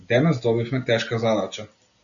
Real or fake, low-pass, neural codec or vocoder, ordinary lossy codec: real; 7.2 kHz; none; MP3, 96 kbps